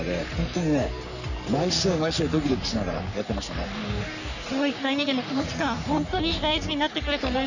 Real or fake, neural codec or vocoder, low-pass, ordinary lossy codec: fake; codec, 44.1 kHz, 3.4 kbps, Pupu-Codec; 7.2 kHz; none